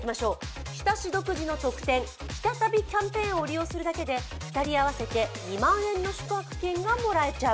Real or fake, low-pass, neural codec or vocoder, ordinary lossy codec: real; none; none; none